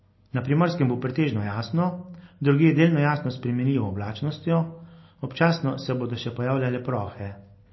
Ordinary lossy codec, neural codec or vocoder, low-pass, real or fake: MP3, 24 kbps; none; 7.2 kHz; real